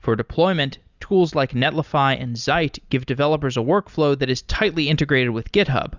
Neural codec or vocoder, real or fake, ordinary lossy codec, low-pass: none; real; Opus, 64 kbps; 7.2 kHz